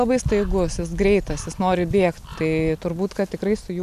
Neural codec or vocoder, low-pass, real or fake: none; 14.4 kHz; real